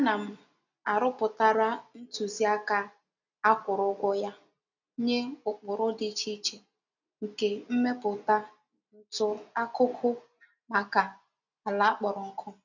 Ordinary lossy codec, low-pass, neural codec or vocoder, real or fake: none; 7.2 kHz; none; real